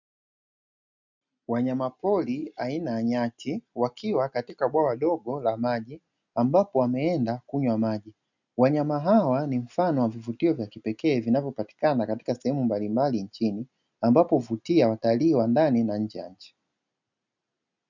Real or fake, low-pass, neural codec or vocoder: real; 7.2 kHz; none